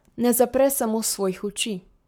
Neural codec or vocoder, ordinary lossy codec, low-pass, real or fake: codec, 44.1 kHz, 7.8 kbps, Pupu-Codec; none; none; fake